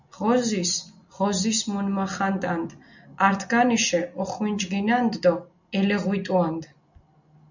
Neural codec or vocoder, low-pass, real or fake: none; 7.2 kHz; real